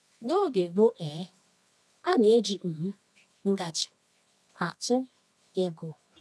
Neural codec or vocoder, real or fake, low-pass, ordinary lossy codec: codec, 24 kHz, 0.9 kbps, WavTokenizer, medium music audio release; fake; none; none